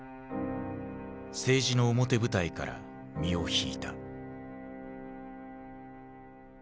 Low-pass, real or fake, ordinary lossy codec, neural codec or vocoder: none; real; none; none